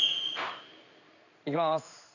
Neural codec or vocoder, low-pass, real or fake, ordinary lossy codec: codec, 16 kHz in and 24 kHz out, 1 kbps, XY-Tokenizer; 7.2 kHz; fake; none